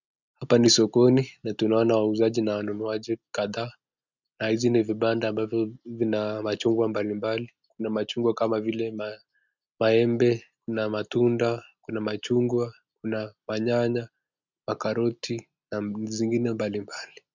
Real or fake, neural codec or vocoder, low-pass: real; none; 7.2 kHz